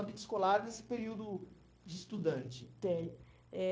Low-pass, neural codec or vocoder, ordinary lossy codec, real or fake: none; codec, 16 kHz, 0.9 kbps, LongCat-Audio-Codec; none; fake